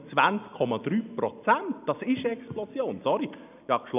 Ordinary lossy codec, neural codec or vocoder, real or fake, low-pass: none; none; real; 3.6 kHz